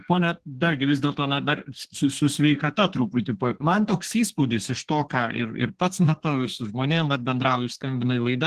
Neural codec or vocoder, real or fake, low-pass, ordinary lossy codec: codec, 32 kHz, 1.9 kbps, SNAC; fake; 14.4 kHz; Opus, 16 kbps